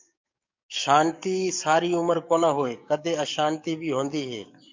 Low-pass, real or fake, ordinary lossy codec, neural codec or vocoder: 7.2 kHz; fake; MP3, 64 kbps; codec, 44.1 kHz, 7.8 kbps, DAC